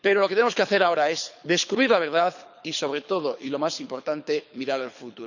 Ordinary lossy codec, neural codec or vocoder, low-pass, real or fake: none; codec, 24 kHz, 6 kbps, HILCodec; 7.2 kHz; fake